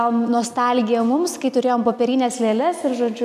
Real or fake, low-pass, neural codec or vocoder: real; 14.4 kHz; none